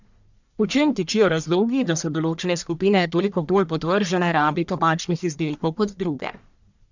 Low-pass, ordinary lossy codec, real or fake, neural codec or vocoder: 7.2 kHz; none; fake; codec, 44.1 kHz, 1.7 kbps, Pupu-Codec